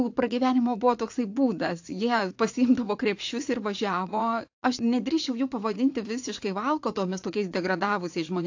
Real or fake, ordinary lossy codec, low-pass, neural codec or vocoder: fake; AAC, 48 kbps; 7.2 kHz; vocoder, 44.1 kHz, 80 mel bands, Vocos